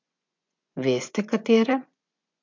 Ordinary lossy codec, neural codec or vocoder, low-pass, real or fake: MP3, 64 kbps; vocoder, 24 kHz, 100 mel bands, Vocos; 7.2 kHz; fake